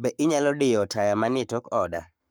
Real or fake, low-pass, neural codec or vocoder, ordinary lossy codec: fake; none; codec, 44.1 kHz, 7.8 kbps, Pupu-Codec; none